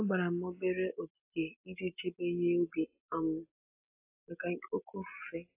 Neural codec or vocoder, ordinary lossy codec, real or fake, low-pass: none; AAC, 32 kbps; real; 3.6 kHz